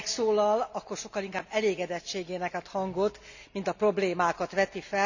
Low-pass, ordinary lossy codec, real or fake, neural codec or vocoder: 7.2 kHz; none; real; none